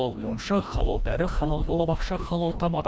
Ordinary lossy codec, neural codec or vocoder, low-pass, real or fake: none; codec, 16 kHz, 1 kbps, FreqCodec, larger model; none; fake